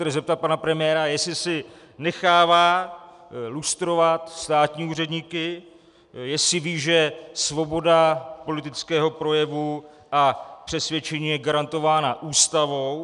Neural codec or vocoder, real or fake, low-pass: none; real; 10.8 kHz